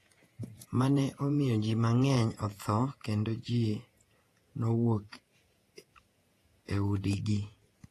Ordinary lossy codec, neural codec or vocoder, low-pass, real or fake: AAC, 48 kbps; vocoder, 48 kHz, 128 mel bands, Vocos; 14.4 kHz; fake